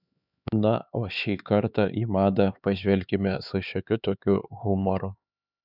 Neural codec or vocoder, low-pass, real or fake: codec, 16 kHz, 4 kbps, X-Codec, HuBERT features, trained on LibriSpeech; 5.4 kHz; fake